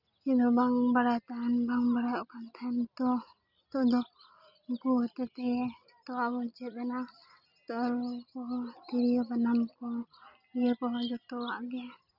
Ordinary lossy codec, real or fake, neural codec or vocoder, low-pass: none; real; none; 5.4 kHz